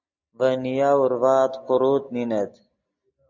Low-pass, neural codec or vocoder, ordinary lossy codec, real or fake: 7.2 kHz; none; MP3, 64 kbps; real